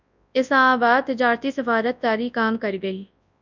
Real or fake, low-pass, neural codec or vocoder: fake; 7.2 kHz; codec, 24 kHz, 0.9 kbps, WavTokenizer, large speech release